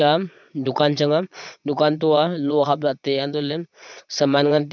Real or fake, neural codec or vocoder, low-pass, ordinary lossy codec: fake; vocoder, 22.05 kHz, 80 mel bands, Vocos; 7.2 kHz; none